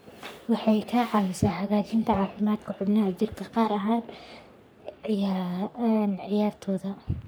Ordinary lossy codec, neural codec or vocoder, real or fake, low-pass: none; codec, 44.1 kHz, 3.4 kbps, Pupu-Codec; fake; none